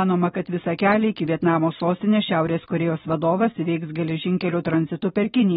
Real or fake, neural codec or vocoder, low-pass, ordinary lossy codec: real; none; 7.2 kHz; AAC, 16 kbps